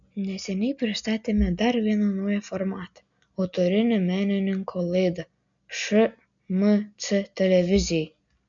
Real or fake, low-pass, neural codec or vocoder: real; 7.2 kHz; none